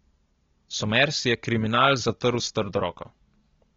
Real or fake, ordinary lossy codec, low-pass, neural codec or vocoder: real; AAC, 24 kbps; 7.2 kHz; none